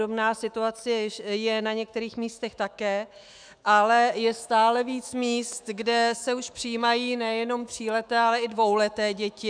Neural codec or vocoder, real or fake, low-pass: autoencoder, 48 kHz, 128 numbers a frame, DAC-VAE, trained on Japanese speech; fake; 9.9 kHz